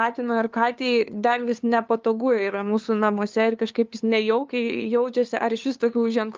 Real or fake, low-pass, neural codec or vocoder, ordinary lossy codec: fake; 7.2 kHz; codec, 16 kHz, 2 kbps, FunCodec, trained on LibriTTS, 25 frames a second; Opus, 24 kbps